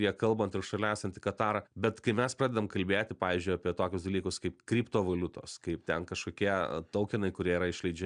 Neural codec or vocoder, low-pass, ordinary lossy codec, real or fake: none; 9.9 kHz; MP3, 96 kbps; real